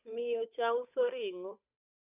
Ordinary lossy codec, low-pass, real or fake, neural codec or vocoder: none; 3.6 kHz; fake; codec, 16 kHz, 8 kbps, FunCodec, trained on Chinese and English, 25 frames a second